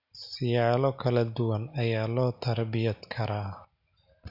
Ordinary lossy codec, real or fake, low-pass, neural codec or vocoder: none; real; 5.4 kHz; none